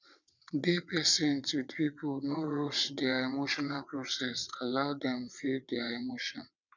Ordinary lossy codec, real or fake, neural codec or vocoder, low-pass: none; fake; vocoder, 22.05 kHz, 80 mel bands, WaveNeXt; 7.2 kHz